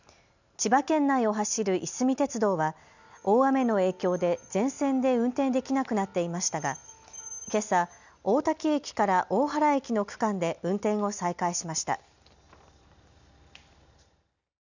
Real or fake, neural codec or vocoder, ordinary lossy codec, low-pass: real; none; none; 7.2 kHz